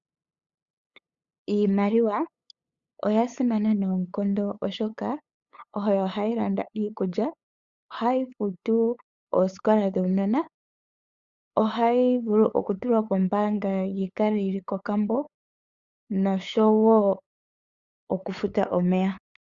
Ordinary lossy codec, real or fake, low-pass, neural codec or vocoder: Opus, 64 kbps; fake; 7.2 kHz; codec, 16 kHz, 8 kbps, FunCodec, trained on LibriTTS, 25 frames a second